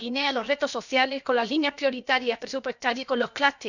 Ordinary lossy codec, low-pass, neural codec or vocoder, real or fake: none; 7.2 kHz; codec, 16 kHz, about 1 kbps, DyCAST, with the encoder's durations; fake